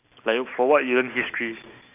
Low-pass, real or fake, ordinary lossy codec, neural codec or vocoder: 3.6 kHz; real; none; none